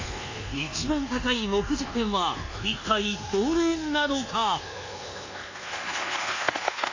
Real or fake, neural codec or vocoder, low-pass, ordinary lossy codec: fake; codec, 24 kHz, 1.2 kbps, DualCodec; 7.2 kHz; AAC, 48 kbps